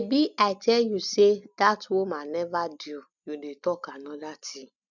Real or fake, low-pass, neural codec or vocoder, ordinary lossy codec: real; 7.2 kHz; none; none